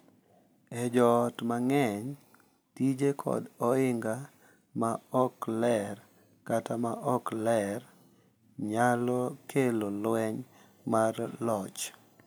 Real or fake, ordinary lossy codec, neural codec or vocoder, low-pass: real; none; none; none